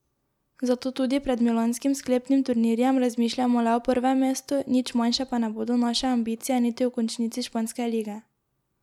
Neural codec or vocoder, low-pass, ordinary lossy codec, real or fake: none; 19.8 kHz; none; real